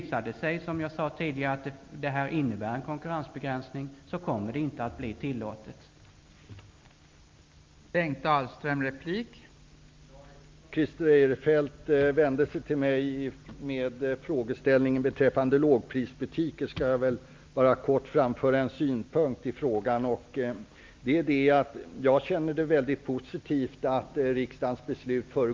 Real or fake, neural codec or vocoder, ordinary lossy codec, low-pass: real; none; Opus, 24 kbps; 7.2 kHz